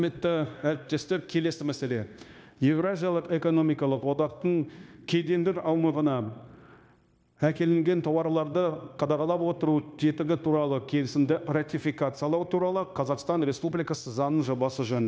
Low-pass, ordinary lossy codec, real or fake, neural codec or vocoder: none; none; fake; codec, 16 kHz, 0.9 kbps, LongCat-Audio-Codec